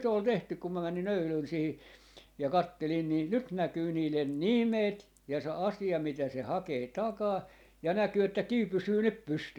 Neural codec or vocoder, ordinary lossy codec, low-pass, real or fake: none; none; 19.8 kHz; real